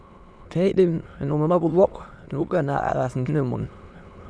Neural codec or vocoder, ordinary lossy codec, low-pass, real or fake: autoencoder, 22.05 kHz, a latent of 192 numbers a frame, VITS, trained on many speakers; none; none; fake